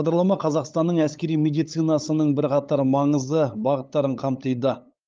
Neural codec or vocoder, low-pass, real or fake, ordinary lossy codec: codec, 16 kHz, 8 kbps, FunCodec, trained on LibriTTS, 25 frames a second; 7.2 kHz; fake; Opus, 24 kbps